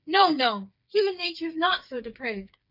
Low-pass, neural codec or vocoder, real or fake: 5.4 kHz; codec, 16 kHz, 4 kbps, FreqCodec, smaller model; fake